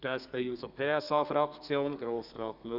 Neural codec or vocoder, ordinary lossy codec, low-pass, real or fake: codec, 32 kHz, 1.9 kbps, SNAC; none; 5.4 kHz; fake